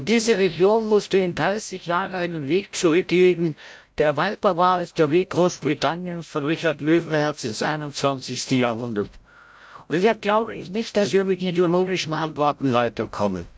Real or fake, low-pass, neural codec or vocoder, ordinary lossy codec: fake; none; codec, 16 kHz, 0.5 kbps, FreqCodec, larger model; none